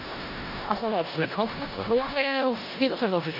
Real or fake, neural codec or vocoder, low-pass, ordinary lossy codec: fake; codec, 16 kHz in and 24 kHz out, 0.4 kbps, LongCat-Audio-Codec, four codebook decoder; 5.4 kHz; none